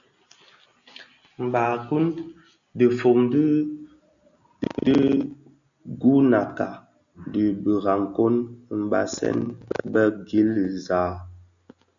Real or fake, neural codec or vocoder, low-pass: real; none; 7.2 kHz